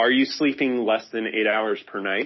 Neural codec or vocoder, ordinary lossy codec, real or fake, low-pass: none; MP3, 24 kbps; real; 7.2 kHz